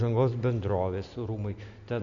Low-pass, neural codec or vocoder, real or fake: 7.2 kHz; none; real